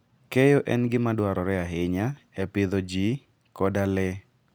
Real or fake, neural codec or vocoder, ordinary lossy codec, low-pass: real; none; none; none